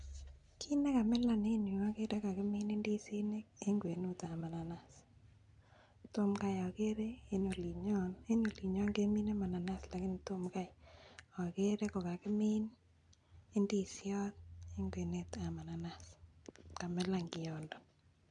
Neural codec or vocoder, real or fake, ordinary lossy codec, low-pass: none; real; none; 9.9 kHz